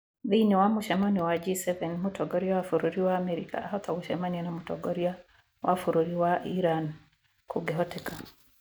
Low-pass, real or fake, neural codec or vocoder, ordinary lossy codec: none; real; none; none